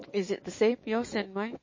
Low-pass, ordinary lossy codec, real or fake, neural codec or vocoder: 7.2 kHz; MP3, 32 kbps; fake; autoencoder, 48 kHz, 128 numbers a frame, DAC-VAE, trained on Japanese speech